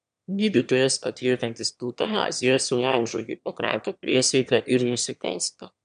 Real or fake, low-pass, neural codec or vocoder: fake; 9.9 kHz; autoencoder, 22.05 kHz, a latent of 192 numbers a frame, VITS, trained on one speaker